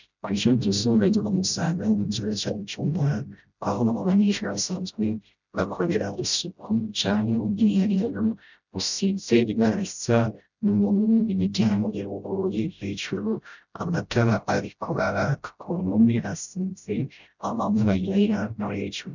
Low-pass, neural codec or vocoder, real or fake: 7.2 kHz; codec, 16 kHz, 0.5 kbps, FreqCodec, smaller model; fake